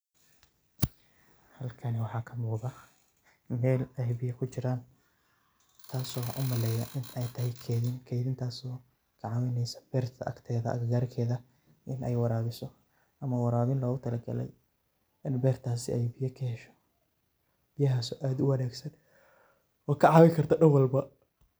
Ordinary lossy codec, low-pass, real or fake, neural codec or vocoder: none; none; real; none